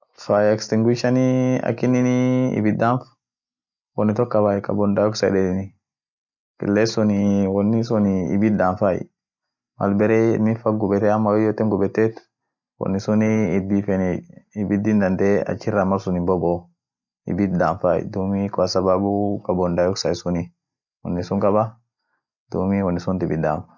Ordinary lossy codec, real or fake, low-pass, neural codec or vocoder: none; real; 7.2 kHz; none